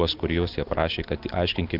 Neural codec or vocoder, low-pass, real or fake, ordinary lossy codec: none; 5.4 kHz; real; Opus, 32 kbps